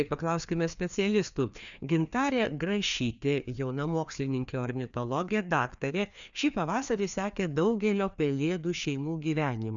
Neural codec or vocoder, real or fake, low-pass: codec, 16 kHz, 2 kbps, FreqCodec, larger model; fake; 7.2 kHz